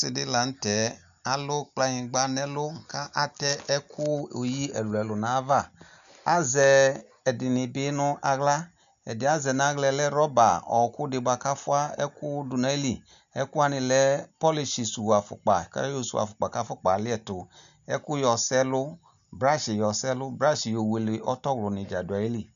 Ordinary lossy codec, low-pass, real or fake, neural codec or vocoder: MP3, 96 kbps; 7.2 kHz; real; none